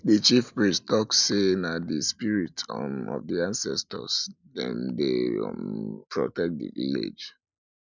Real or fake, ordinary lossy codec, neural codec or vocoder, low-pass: real; none; none; 7.2 kHz